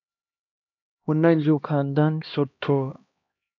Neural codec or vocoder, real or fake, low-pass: codec, 16 kHz, 1 kbps, X-Codec, HuBERT features, trained on LibriSpeech; fake; 7.2 kHz